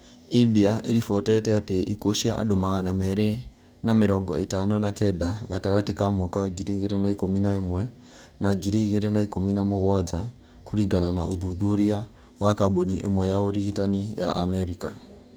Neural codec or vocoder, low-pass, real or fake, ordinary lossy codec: codec, 44.1 kHz, 2.6 kbps, DAC; none; fake; none